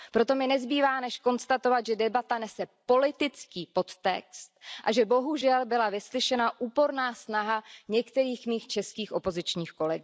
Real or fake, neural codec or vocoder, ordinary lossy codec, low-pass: real; none; none; none